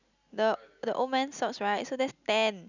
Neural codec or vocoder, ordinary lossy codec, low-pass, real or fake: none; none; 7.2 kHz; real